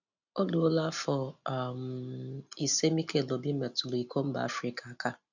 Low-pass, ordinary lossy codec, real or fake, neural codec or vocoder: 7.2 kHz; none; real; none